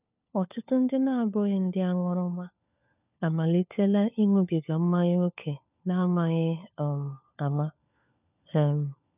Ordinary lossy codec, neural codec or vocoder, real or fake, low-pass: none; codec, 16 kHz, 4 kbps, FunCodec, trained on LibriTTS, 50 frames a second; fake; 3.6 kHz